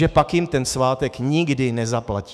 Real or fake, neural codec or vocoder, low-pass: fake; autoencoder, 48 kHz, 128 numbers a frame, DAC-VAE, trained on Japanese speech; 14.4 kHz